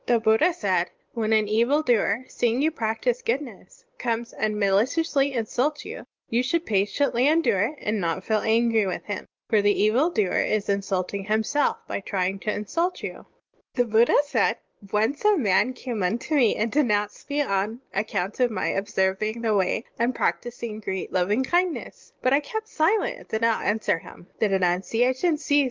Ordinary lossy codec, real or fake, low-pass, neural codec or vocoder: Opus, 24 kbps; real; 7.2 kHz; none